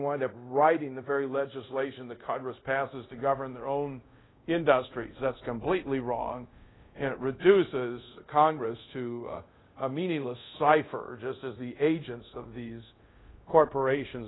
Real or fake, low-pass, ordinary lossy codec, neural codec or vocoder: fake; 7.2 kHz; AAC, 16 kbps; codec, 24 kHz, 0.5 kbps, DualCodec